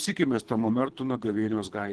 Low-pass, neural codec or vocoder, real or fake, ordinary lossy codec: 10.8 kHz; codec, 24 kHz, 3 kbps, HILCodec; fake; Opus, 16 kbps